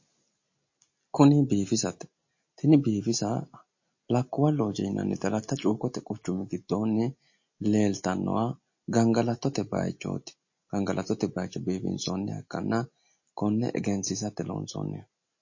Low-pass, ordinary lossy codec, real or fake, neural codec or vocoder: 7.2 kHz; MP3, 32 kbps; real; none